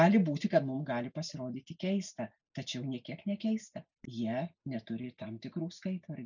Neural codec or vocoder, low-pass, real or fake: none; 7.2 kHz; real